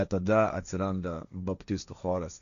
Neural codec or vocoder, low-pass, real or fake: codec, 16 kHz, 1.1 kbps, Voila-Tokenizer; 7.2 kHz; fake